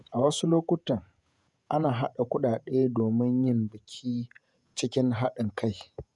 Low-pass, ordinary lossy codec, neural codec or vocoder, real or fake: 10.8 kHz; none; vocoder, 48 kHz, 128 mel bands, Vocos; fake